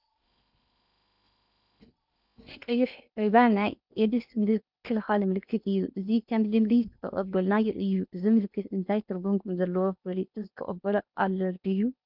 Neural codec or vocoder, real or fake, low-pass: codec, 16 kHz in and 24 kHz out, 0.8 kbps, FocalCodec, streaming, 65536 codes; fake; 5.4 kHz